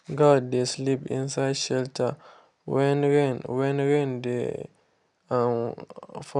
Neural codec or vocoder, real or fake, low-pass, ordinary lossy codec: none; real; 10.8 kHz; none